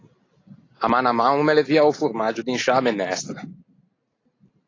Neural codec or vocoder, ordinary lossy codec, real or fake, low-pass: none; AAC, 32 kbps; real; 7.2 kHz